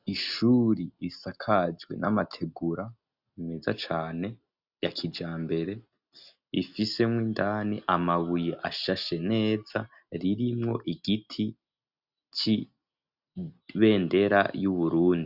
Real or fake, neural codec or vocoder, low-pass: real; none; 5.4 kHz